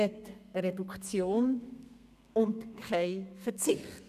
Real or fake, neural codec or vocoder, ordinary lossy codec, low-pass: fake; codec, 32 kHz, 1.9 kbps, SNAC; none; 14.4 kHz